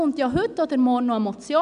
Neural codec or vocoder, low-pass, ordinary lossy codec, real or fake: none; 9.9 kHz; none; real